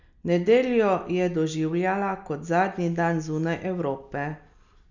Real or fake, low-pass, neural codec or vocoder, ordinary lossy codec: real; 7.2 kHz; none; none